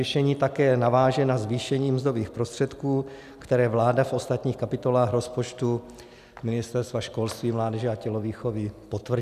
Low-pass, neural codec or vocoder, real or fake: 14.4 kHz; none; real